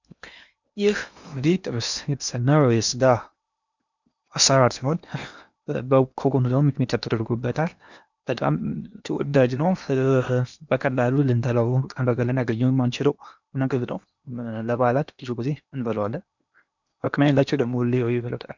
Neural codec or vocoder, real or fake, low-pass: codec, 16 kHz in and 24 kHz out, 0.8 kbps, FocalCodec, streaming, 65536 codes; fake; 7.2 kHz